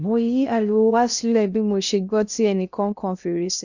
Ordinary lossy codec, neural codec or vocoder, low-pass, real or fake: none; codec, 16 kHz in and 24 kHz out, 0.6 kbps, FocalCodec, streaming, 2048 codes; 7.2 kHz; fake